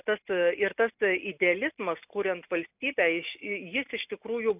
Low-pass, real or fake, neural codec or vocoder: 3.6 kHz; real; none